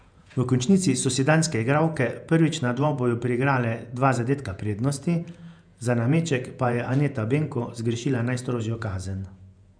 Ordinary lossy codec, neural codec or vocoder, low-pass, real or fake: none; vocoder, 48 kHz, 128 mel bands, Vocos; 9.9 kHz; fake